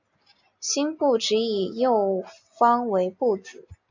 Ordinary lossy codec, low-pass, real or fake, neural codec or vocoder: AAC, 48 kbps; 7.2 kHz; real; none